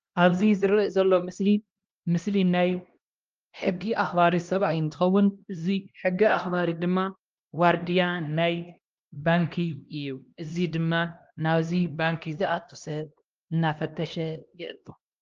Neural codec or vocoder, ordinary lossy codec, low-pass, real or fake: codec, 16 kHz, 1 kbps, X-Codec, HuBERT features, trained on LibriSpeech; Opus, 24 kbps; 7.2 kHz; fake